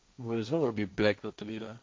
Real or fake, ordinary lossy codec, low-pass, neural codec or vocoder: fake; none; none; codec, 16 kHz, 1.1 kbps, Voila-Tokenizer